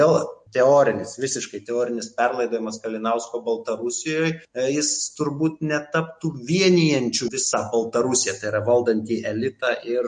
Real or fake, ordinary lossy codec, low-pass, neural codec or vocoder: real; MP3, 48 kbps; 9.9 kHz; none